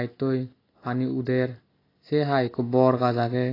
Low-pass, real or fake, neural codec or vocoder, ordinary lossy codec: 5.4 kHz; real; none; AAC, 24 kbps